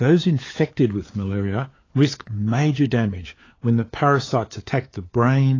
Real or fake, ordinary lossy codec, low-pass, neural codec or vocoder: fake; AAC, 32 kbps; 7.2 kHz; codec, 16 kHz, 4 kbps, FunCodec, trained on LibriTTS, 50 frames a second